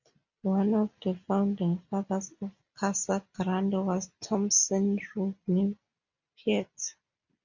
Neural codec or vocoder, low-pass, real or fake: none; 7.2 kHz; real